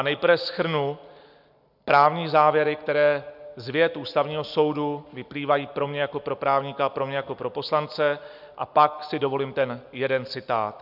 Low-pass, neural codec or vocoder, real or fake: 5.4 kHz; none; real